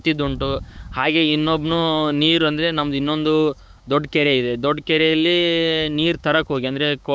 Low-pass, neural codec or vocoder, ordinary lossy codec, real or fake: none; codec, 16 kHz, 6 kbps, DAC; none; fake